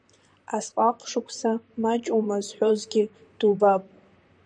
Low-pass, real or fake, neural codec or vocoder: 9.9 kHz; fake; vocoder, 44.1 kHz, 128 mel bands, Pupu-Vocoder